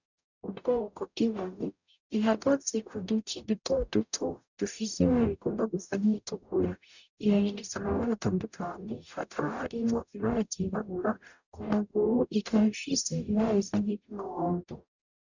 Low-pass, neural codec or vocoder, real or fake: 7.2 kHz; codec, 44.1 kHz, 0.9 kbps, DAC; fake